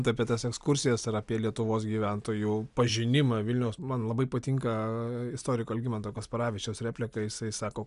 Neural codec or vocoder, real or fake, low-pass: none; real; 10.8 kHz